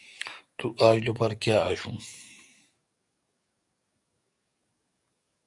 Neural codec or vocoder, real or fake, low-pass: codec, 44.1 kHz, 7.8 kbps, DAC; fake; 10.8 kHz